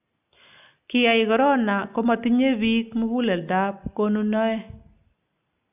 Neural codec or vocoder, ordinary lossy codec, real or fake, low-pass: none; AAC, 32 kbps; real; 3.6 kHz